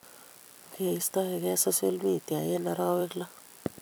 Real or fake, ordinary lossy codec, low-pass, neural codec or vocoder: fake; none; none; vocoder, 44.1 kHz, 128 mel bands every 256 samples, BigVGAN v2